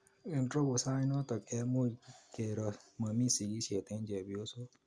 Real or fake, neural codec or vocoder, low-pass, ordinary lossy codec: real; none; 9.9 kHz; Opus, 64 kbps